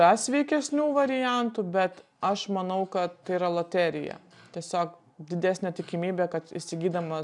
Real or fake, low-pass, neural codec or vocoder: real; 10.8 kHz; none